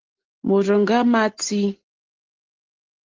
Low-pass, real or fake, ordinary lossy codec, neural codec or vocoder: 7.2 kHz; real; Opus, 16 kbps; none